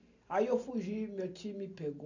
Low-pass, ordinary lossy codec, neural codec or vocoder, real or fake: 7.2 kHz; none; none; real